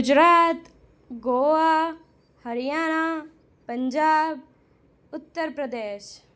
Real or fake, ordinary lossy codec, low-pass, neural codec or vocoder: real; none; none; none